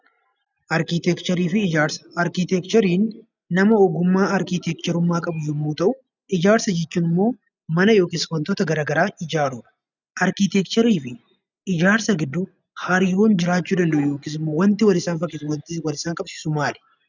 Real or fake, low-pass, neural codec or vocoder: real; 7.2 kHz; none